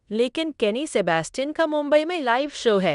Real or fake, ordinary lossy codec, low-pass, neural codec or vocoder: fake; none; 10.8 kHz; codec, 16 kHz in and 24 kHz out, 0.9 kbps, LongCat-Audio-Codec, fine tuned four codebook decoder